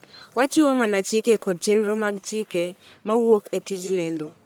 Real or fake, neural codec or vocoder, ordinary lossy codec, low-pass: fake; codec, 44.1 kHz, 1.7 kbps, Pupu-Codec; none; none